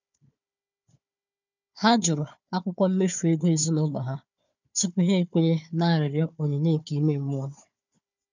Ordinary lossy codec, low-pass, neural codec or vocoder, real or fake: none; 7.2 kHz; codec, 16 kHz, 4 kbps, FunCodec, trained on Chinese and English, 50 frames a second; fake